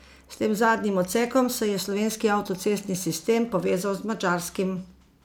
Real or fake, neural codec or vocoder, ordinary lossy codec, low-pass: real; none; none; none